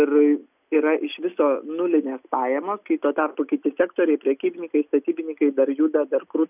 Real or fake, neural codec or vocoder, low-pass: real; none; 3.6 kHz